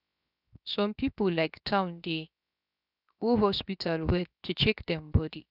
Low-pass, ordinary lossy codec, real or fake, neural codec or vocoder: 5.4 kHz; none; fake; codec, 16 kHz, 0.7 kbps, FocalCodec